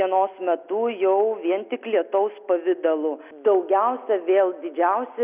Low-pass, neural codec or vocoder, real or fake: 3.6 kHz; none; real